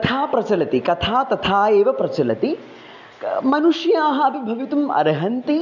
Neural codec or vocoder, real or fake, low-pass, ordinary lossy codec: none; real; 7.2 kHz; none